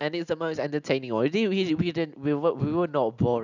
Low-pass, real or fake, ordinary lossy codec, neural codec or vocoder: 7.2 kHz; real; none; none